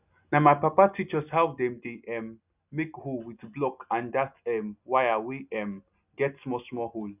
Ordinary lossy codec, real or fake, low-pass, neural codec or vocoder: none; real; 3.6 kHz; none